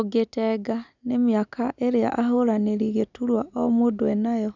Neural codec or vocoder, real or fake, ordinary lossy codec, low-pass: none; real; none; 7.2 kHz